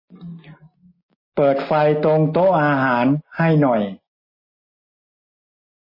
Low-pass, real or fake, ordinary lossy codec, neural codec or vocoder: 5.4 kHz; real; MP3, 24 kbps; none